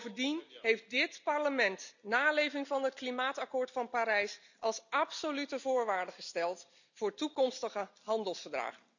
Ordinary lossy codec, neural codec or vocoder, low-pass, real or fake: none; none; 7.2 kHz; real